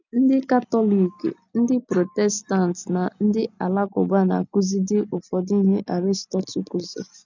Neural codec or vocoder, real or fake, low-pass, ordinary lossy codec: none; real; 7.2 kHz; none